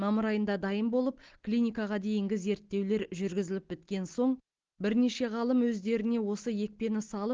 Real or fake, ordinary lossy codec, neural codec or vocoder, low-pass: real; Opus, 16 kbps; none; 7.2 kHz